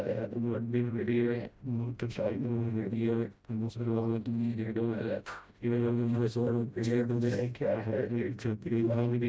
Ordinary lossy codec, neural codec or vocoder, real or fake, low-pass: none; codec, 16 kHz, 0.5 kbps, FreqCodec, smaller model; fake; none